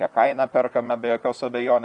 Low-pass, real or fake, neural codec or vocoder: 10.8 kHz; fake; vocoder, 44.1 kHz, 128 mel bands, Pupu-Vocoder